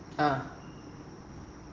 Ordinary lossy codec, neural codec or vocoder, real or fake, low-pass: Opus, 16 kbps; none; real; 7.2 kHz